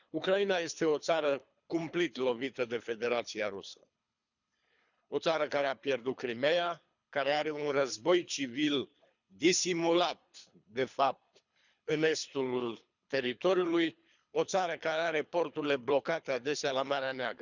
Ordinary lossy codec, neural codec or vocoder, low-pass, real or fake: none; codec, 24 kHz, 3 kbps, HILCodec; 7.2 kHz; fake